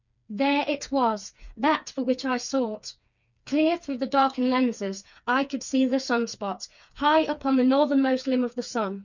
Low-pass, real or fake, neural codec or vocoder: 7.2 kHz; fake; codec, 16 kHz, 4 kbps, FreqCodec, smaller model